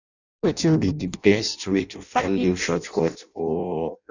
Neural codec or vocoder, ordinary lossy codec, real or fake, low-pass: codec, 16 kHz in and 24 kHz out, 0.6 kbps, FireRedTTS-2 codec; none; fake; 7.2 kHz